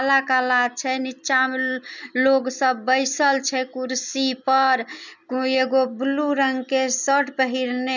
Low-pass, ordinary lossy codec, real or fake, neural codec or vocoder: 7.2 kHz; none; real; none